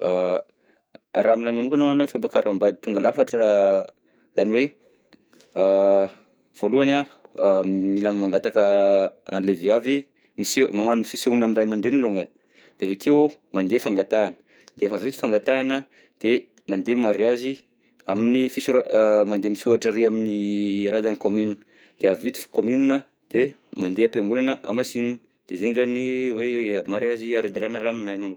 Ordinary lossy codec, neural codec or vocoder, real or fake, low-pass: none; codec, 44.1 kHz, 2.6 kbps, SNAC; fake; none